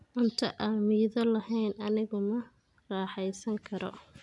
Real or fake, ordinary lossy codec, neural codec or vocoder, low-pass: real; none; none; 10.8 kHz